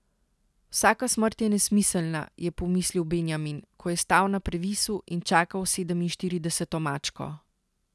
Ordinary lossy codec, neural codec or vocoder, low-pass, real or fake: none; none; none; real